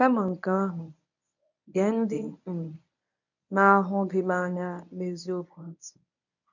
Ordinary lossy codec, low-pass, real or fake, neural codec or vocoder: none; 7.2 kHz; fake; codec, 24 kHz, 0.9 kbps, WavTokenizer, medium speech release version 1